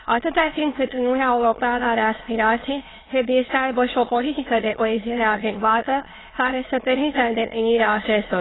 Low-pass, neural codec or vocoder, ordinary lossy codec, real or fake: 7.2 kHz; autoencoder, 22.05 kHz, a latent of 192 numbers a frame, VITS, trained on many speakers; AAC, 16 kbps; fake